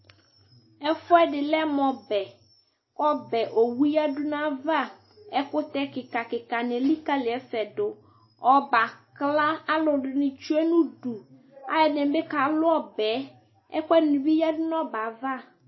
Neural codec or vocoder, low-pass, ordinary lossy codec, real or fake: none; 7.2 kHz; MP3, 24 kbps; real